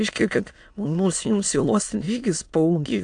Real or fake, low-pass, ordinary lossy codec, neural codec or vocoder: fake; 9.9 kHz; AAC, 64 kbps; autoencoder, 22.05 kHz, a latent of 192 numbers a frame, VITS, trained on many speakers